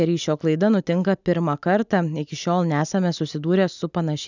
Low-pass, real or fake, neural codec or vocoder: 7.2 kHz; real; none